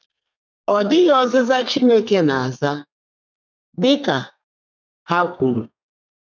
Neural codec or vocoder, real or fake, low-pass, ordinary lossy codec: codec, 32 kHz, 1.9 kbps, SNAC; fake; 7.2 kHz; none